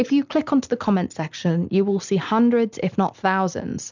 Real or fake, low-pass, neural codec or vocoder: real; 7.2 kHz; none